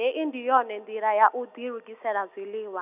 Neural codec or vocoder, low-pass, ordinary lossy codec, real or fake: none; 3.6 kHz; none; real